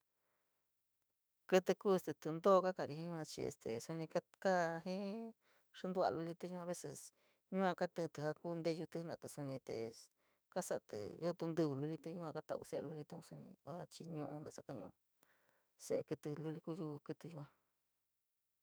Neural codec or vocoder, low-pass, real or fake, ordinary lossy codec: autoencoder, 48 kHz, 32 numbers a frame, DAC-VAE, trained on Japanese speech; none; fake; none